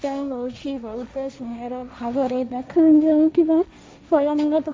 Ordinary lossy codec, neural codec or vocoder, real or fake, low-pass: none; codec, 16 kHz, 1.1 kbps, Voila-Tokenizer; fake; none